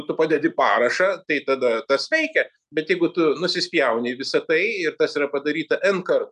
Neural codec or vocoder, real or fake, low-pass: none; real; 10.8 kHz